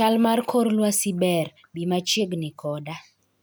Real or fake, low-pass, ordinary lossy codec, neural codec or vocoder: real; none; none; none